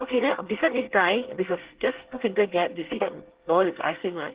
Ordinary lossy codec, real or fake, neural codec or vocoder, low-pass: Opus, 16 kbps; fake; codec, 24 kHz, 1 kbps, SNAC; 3.6 kHz